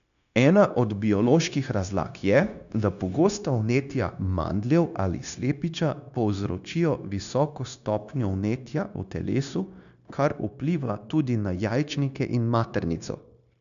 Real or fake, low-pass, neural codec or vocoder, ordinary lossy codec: fake; 7.2 kHz; codec, 16 kHz, 0.9 kbps, LongCat-Audio-Codec; none